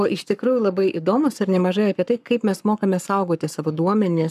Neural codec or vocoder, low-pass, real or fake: codec, 44.1 kHz, 7.8 kbps, Pupu-Codec; 14.4 kHz; fake